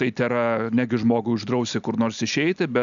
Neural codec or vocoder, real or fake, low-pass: none; real; 7.2 kHz